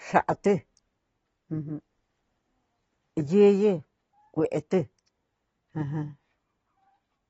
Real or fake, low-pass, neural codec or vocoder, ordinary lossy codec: real; 9.9 kHz; none; AAC, 24 kbps